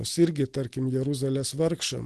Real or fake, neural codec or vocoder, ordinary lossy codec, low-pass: real; none; Opus, 16 kbps; 10.8 kHz